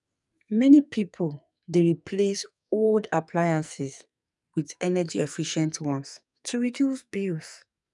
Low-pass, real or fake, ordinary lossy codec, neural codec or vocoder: 10.8 kHz; fake; none; codec, 44.1 kHz, 2.6 kbps, SNAC